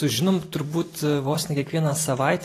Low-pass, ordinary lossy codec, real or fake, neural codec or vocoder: 14.4 kHz; AAC, 48 kbps; fake; vocoder, 44.1 kHz, 128 mel bands every 512 samples, BigVGAN v2